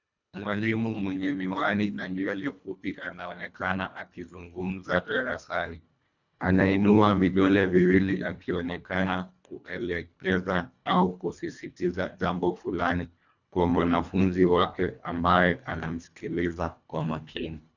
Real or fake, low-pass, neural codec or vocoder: fake; 7.2 kHz; codec, 24 kHz, 1.5 kbps, HILCodec